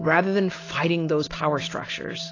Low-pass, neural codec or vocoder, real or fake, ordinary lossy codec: 7.2 kHz; none; real; AAC, 32 kbps